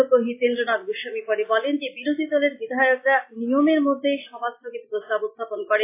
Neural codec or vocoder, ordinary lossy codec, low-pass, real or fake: none; AAC, 24 kbps; 3.6 kHz; real